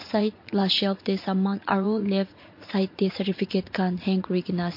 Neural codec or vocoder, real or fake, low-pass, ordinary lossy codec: vocoder, 44.1 kHz, 80 mel bands, Vocos; fake; 5.4 kHz; MP3, 32 kbps